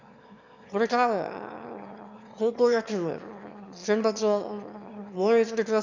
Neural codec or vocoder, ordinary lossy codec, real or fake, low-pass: autoencoder, 22.05 kHz, a latent of 192 numbers a frame, VITS, trained on one speaker; none; fake; 7.2 kHz